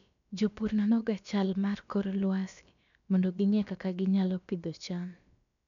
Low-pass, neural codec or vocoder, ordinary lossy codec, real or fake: 7.2 kHz; codec, 16 kHz, about 1 kbps, DyCAST, with the encoder's durations; none; fake